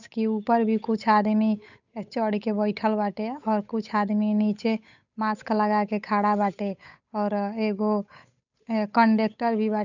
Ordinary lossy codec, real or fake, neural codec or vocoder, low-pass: none; fake; codec, 16 kHz, 8 kbps, FunCodec, trained on Chinese and English, 25 frames a second; 7.2 kHz